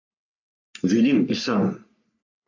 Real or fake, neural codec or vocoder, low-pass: fake; codec, 44.1 kHz, 3.4 kbps, Pupu-Codec; 7.2 kHz